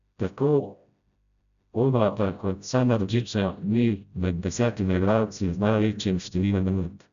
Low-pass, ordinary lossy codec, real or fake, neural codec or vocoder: 7.2 kHz; none; fake; codec, 16 kHz, 0.5 kbps, FreqCodec, smaller model